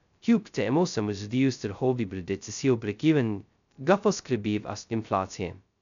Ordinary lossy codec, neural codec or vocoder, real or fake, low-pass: none; codec, 16 kHz, 0.2 kbps, FocalCodec; fake; 7.2 kHz